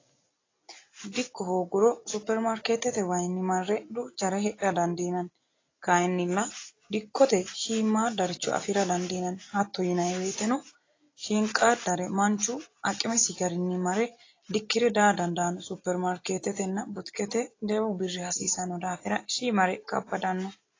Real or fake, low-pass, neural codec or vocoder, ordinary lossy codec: real; 7.2 kHz; none; AAC, 32 kbps